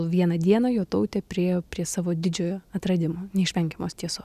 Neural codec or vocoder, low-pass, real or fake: none; 14.4 kHz; real